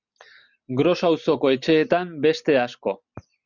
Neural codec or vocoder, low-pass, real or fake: none; 7.2 kHz; real